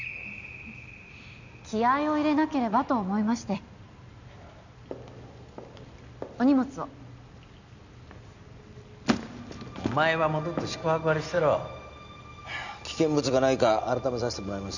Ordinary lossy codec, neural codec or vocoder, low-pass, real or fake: none; none; 7.2 kHz; real